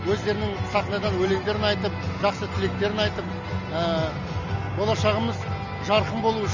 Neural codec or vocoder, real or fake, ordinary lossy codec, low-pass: none; real; none; 7.2 kHz